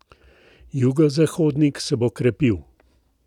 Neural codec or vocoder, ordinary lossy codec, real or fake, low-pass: none; none; real; 19.8 kHz